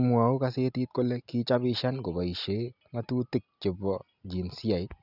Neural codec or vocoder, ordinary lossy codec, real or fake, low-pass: none; none; real; 5.4 kHz